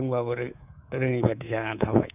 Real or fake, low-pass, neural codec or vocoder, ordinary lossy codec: fake; 3.6 kHz; codec, 24 kHz, 3.1 kbps, DualCodec; none